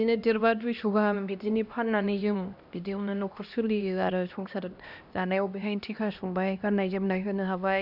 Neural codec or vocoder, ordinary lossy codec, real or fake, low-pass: codec, 16 kHz, 1 kbps, X-Codec, HuBERT features, trained on LibriSpeech; none; fake; 5.4 kHz